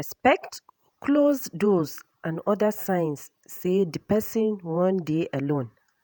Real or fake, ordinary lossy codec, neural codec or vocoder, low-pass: fake; none; vocoder, 48 kHz, 128 mel bands, Vocos; none